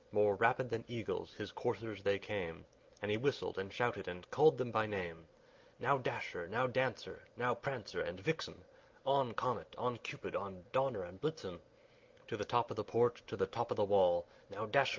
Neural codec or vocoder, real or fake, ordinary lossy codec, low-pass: none; real; Opus, 32 kbps; 7.2 kHz